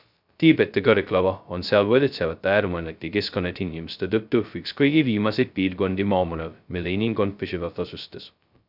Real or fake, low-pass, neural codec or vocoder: fake; 5.4 kHz; codec, 16 kHz, 0.2 kbps, FocalCodec